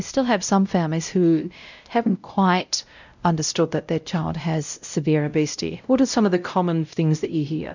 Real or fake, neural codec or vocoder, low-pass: fake; codec, 16 kHz, 0.5 kbps, X-Codec, WavLM features, trained on Multilingual LibriSpeech; 7.2 kHz